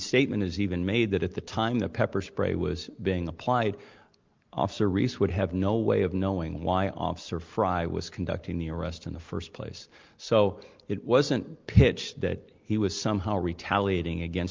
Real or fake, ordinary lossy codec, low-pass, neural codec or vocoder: real; Opus, 32 kbps; 7.2 kHz; none